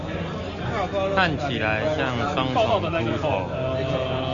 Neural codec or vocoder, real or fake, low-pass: none; real; 7.2 kHz